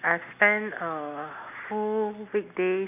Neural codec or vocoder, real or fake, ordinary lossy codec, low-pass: none; real; none; 3.6 kHz